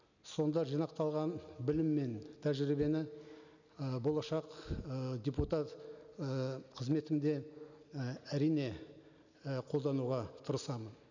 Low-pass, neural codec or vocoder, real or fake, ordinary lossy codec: 7.2 kHz; none; real; none